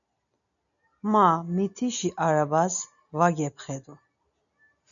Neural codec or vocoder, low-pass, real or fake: none; 7.2 kHz; real